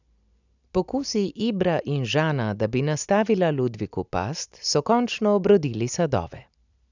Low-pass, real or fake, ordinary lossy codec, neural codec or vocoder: 7.2 kHz; real; none; none